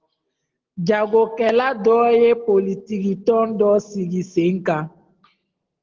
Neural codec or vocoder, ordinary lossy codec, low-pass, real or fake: none; Opus, 16 kbps; 7.2 kHz; real